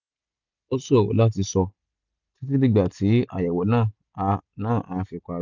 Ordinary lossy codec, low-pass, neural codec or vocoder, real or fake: none; 7.2 kHz; none; real